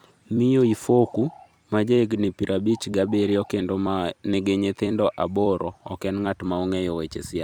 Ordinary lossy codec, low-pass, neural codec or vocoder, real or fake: none; 19.8 kHz; vocoder, 44.1 kHz, 128 mel bands every 256 samples, BigVGAN v2; fake